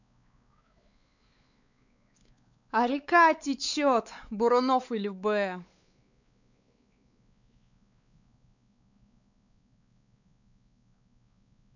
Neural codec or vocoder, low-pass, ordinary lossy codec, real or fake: codec, 16 kHz, 4 kbps, X-Codec, WavLM features, trained on Multilingual LibriSpeech; 7.2 kHz; none; fake